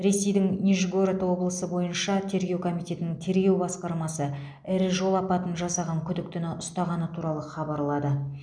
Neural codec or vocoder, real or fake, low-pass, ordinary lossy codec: none; real; 9.9 kHz; none